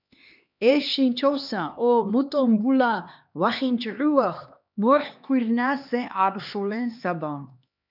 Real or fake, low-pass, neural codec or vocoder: fake; 5.4 kHz; codec, 16 kHz, 2 kbps, X-Codec, HuBERT features, trained on LibriSpeech